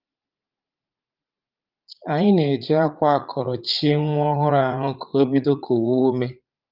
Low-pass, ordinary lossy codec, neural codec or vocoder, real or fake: 5.4 kHz; Opus, 32 kbps; vocoder, 44.1 kHz, 80 mel bands, Vocos; fake